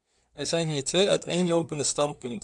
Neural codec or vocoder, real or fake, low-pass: codec, 24 kHz, 1 kbps, SNAC; fake; 10.8 kHz